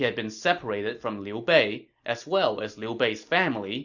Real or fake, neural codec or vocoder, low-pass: real; none; 7.2 kHz